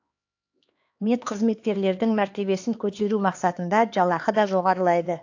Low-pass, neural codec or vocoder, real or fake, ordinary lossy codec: 7.2 kHz; codec, 16 kHz, 2 kbps, X-Codec, HuBERT features, trained on LibriSpeech; fake; AAC, 48 kbps